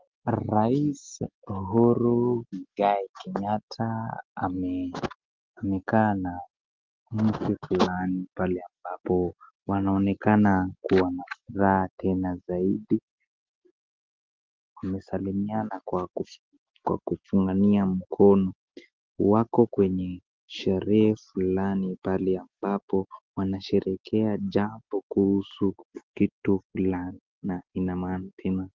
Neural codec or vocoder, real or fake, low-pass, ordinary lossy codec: none; real; 7.2 kHz; Opus, 16 kbps